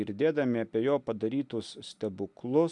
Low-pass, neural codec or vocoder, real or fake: 10.8 kHz; none; real